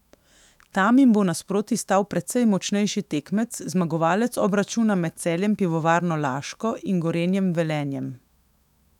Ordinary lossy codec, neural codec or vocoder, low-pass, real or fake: none; autoencoder, 48 kHz, 128 numbers a frame, DAC-VAE, trained on Japanese speech; 19.8 kHz; fake